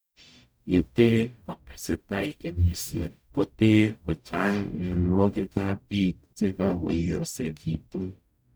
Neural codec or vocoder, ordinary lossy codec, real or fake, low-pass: codec, 44.1 kHz, 0.9 kbps, DAC; none; fake; none